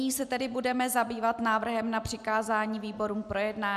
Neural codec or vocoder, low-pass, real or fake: none; 14.4 kHz; real